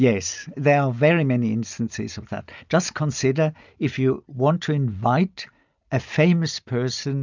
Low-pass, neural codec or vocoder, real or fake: 7.2 kHz; none; real